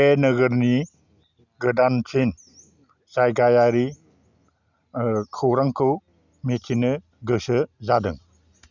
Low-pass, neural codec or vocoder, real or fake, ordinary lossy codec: 7.2 kHz; none; real; none